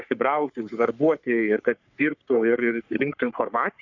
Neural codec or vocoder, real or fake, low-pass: codec, 44.1 kHz, 3.4 kbps, Pupu-Codec; fake; 7.2 kHz